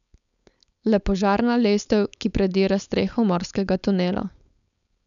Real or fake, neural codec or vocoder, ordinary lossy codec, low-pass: fake; codec, 16 kHz, 4.8 kbps, FACodec; none; 7.2 kHz